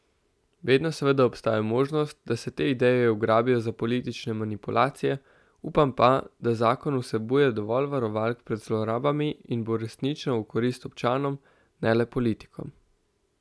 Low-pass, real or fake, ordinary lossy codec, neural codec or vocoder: none; real; none; none